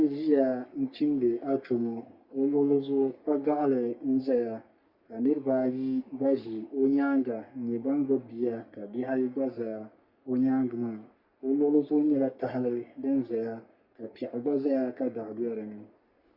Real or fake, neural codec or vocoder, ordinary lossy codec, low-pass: fake; codec, 44.1 kHz, 3.4 kbps, Pupu-Codec; Opus, 64 kbps; 5.4 kHz